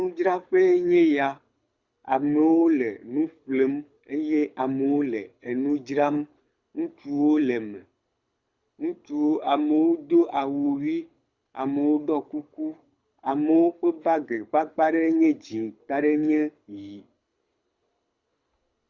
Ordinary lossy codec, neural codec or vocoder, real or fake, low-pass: Opus, 64 kbps; codec, 24 kHz, 6 kbps, HILCodec; fake; 7.2 kHz